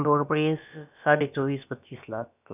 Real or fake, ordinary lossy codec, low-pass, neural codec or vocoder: fake; none; 3.6 kHz; codec, 16 kHz, about 1 kbps, DyCAST, with the encoder's durations